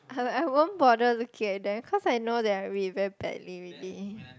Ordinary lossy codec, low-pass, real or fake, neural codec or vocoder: none; none; real; none